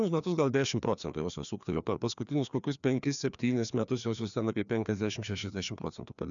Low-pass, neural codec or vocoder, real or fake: 7.2 kHz; codec, 16 kHz, 2 kbps, FreqCodec, larger model; fake